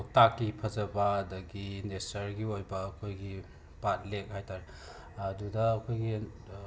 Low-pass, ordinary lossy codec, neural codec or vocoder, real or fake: none; none; none; real